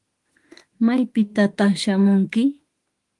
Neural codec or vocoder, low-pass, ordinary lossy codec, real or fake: autoencoder, 48 kHz, 32 numbers a frame, DAC-VAE, trained on Japanese speech; 10.8 kHz; Opus, 24 kbps; fake